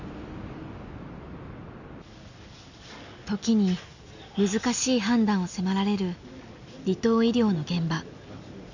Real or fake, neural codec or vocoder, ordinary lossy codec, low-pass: real; none; none; 7.2 kHz